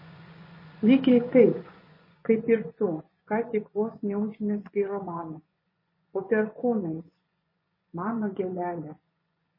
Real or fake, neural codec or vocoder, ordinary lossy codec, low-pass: fake; vocoder, 24 kHz, 100 mel bands, Vocos; MP3, 24 kbps; 5.4 kHz